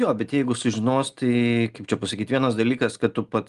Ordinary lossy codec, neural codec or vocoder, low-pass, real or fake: Opus, 32 kbps; none; 10.8 kHz; real